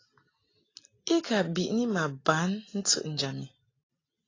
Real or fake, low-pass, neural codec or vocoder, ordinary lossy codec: real; 7.2 kHz; none; AAC, 32 kbps